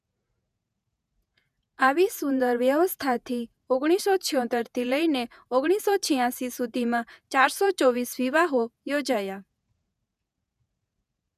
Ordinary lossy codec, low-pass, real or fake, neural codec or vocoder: none; 14.4 kHz; fake; vocoder, 48 kHz, 128 mel bands, Vocos